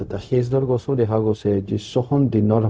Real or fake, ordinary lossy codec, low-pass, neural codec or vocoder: fake; none; none; codec, 16 kHz, 0.4 kbps, LongCat-Audio-Codec